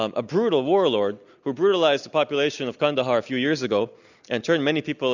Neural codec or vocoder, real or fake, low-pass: none; real; 7.2 kHz